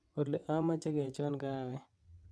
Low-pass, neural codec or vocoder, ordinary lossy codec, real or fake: none; vocoder, 22.05 kHz, 80 mel bands, WaveNeXt; none; fake